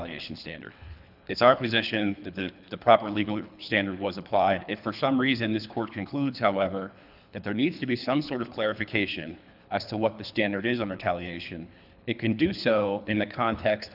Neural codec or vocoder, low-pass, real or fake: codec, 24 kHz, 3 kbps, HILCodec; 5.4 kHz; fake